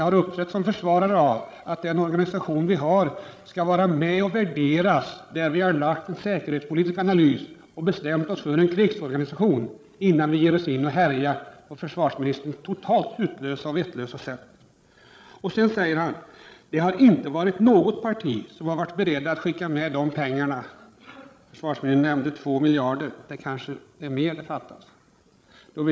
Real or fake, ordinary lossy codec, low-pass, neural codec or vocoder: fake; none; none; codec, 16 kHz, 16 kbps, FreqCodec, larger model